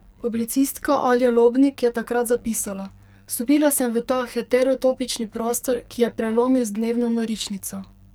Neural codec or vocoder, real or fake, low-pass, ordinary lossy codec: codec, 44.1 kHz, 2.6 kbps, SNAC; fake; none; none